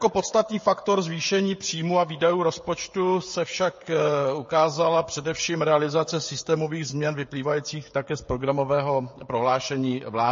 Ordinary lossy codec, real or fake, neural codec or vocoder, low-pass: MP3, 32 kbps; fake; codec, 16 kHz, 16 kbps, FreqCodec, smaller model; 7.2 kHz